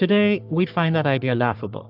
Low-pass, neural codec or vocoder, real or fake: 5.4 kHz; codec, 44.1 kHz, 3.4 kbps, Pupu-Codec; fake